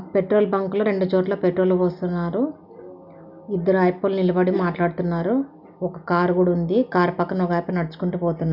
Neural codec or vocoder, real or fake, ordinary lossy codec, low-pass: none; real; none; 5.4 kHz